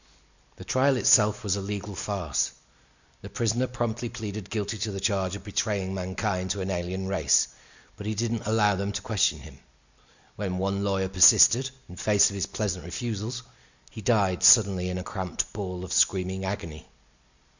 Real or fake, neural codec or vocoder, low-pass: real; none; 7.2 kHz